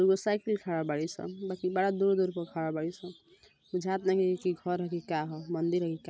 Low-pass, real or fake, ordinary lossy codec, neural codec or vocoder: none; real; none; none